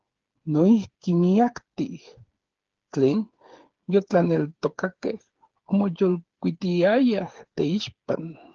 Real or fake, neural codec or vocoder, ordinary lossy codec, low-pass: fake; codec, 16 kHz, 8 kbps, FreqCodec, smaller model; Opus, 16 kbps; 7.2 kHz